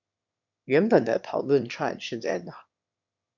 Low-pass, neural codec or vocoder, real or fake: 7.2 kHz; autoencoder, 22.05 kHz, a latent of 192 numbers a frame, VITS, trained on one speaker; fake